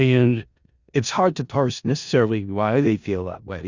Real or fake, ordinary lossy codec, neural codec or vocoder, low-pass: fake; Opus, 64 kbps; codec, 16 kHz in and 24 kHz out, 0.4 kbps, LongCat-Audio-Codec, four codebook decoder; 7.2 kHz